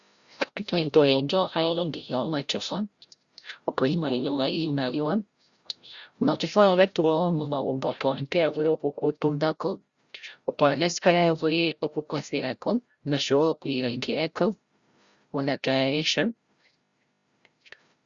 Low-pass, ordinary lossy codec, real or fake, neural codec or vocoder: 7.2 kHz; Opus, 64 kbps; fake; codec, 16 kHz, 0.5 kbps, FreqCodec, larger model